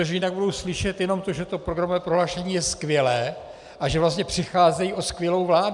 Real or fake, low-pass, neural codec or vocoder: real; 10.8 kHz; none